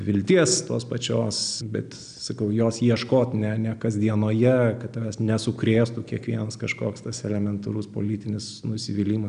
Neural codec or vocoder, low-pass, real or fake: none; 9.9 kHz; real